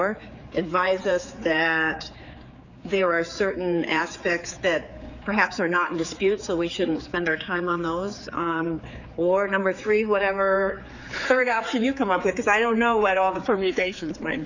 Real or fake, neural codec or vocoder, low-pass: fake; codec, 16 kHz, 4 kbps, X-Codec, HuBERT features, trained on general audio; 7.2 kHz